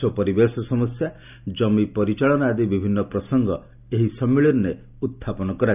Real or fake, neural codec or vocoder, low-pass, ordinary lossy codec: real; none; 3.6 kHz; none